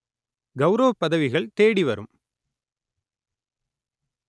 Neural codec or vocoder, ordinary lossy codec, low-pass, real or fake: none; none; none; real